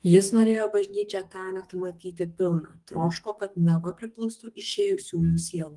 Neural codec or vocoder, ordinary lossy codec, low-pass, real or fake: codec, 32 kHz, 1.9 kbps, SNAC; Opus, 24 kbps; 10.8 kHz; fake